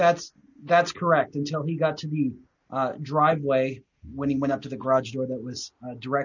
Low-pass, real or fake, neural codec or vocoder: 7.2 kHz; real; none